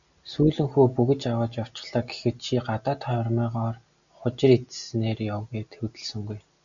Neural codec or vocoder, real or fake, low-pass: none; real; 7.2 kHz